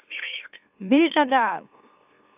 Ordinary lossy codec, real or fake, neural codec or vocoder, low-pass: AAC, 32 kbps; fake; autoencoder, 44.1 kHz, a latent of 192 numbers a frame, MeloTTS; 3.6 kHz